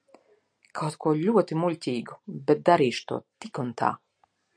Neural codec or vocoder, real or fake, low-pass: none; real; 9.9 kHz